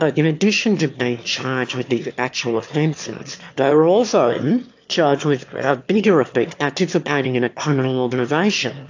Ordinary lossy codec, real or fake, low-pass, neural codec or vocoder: AAC, 48 kbps; fake; 7.2 kHz; autoencoder, 22.05 kHz, a latent of 192 numbers a frame, VITS, trained on one speaker